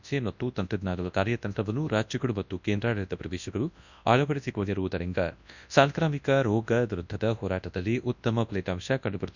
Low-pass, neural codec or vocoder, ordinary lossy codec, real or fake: 7.2 kHz; codec, 24 kHz, 0.9 kbps, WavTokenizer, large speech release; none; fake